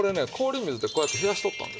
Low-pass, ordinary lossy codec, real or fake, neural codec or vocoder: none; none; real; none